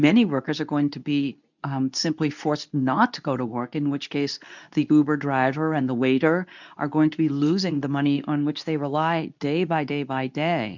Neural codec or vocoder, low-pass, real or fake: codec, 24 kHz, 0.9 kbps, WavTokenizer, medium speech release version 2; 7.2 kHz; fake